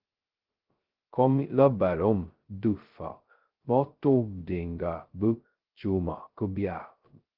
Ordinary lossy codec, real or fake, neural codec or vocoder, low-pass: Opus, 16 kbps; fake; codec, 16 kHz, 0.2 kbps, FocalCodec; 5.4 kHz